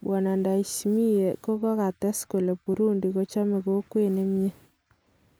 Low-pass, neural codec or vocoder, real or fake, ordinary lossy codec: none; none; real; none